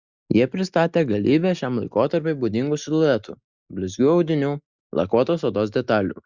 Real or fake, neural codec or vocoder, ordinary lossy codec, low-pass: real; none; Opus, 64 kbps; 7.2 kHz